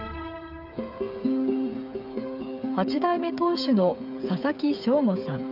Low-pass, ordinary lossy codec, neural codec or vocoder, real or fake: 5.4 kHz; none; codec, 16 kHz, 8 kbps, FreqCodec, smaller model; fake